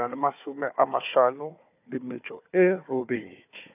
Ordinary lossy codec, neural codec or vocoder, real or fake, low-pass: AAC, 32 kbps; codec, 16 kHz, 4 kbps, FunCodec, trained on Chinese and English, 50 frames a second; fake; 3.6 kHz